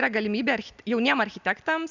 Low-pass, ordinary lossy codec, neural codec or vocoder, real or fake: 7.2 kHz; Opus, 64 kbps; none; real